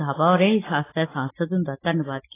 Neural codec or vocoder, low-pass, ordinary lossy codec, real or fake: none; 3.6 kHz; AAC, 16 kbps; real